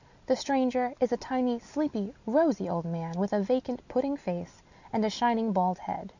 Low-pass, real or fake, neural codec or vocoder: 7.2 kHz; real; none